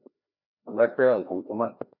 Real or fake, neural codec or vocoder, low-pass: fake; codec, 16 kHz, 1 kbps, FreqCodec, larger model; 5.4 kHz